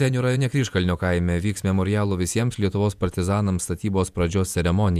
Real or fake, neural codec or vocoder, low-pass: fake; vocoder, 48 kHz, 128 mel bands, Vocos; 14.4 kHz